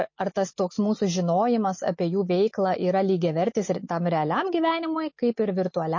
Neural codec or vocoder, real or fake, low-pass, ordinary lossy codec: none; real; 7.2 kHz; MP3, 32 kbps